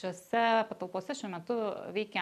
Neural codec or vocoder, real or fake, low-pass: none; real; 14.4 kHz